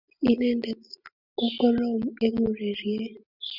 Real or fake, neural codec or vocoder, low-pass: real; none; 5.4 kHz